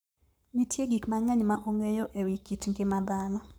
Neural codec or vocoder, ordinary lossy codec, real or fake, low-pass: codec, 44.1 kHz, 7.8 kbps, Pupu-Codec; none; fake; none